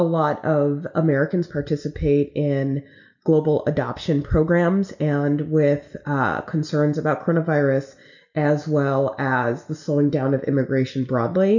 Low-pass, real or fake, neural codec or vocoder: 7.2 kHz; real; none